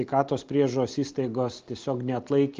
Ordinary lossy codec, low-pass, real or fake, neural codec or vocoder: Opus, 24 kbps; 7.2 kHz; real; none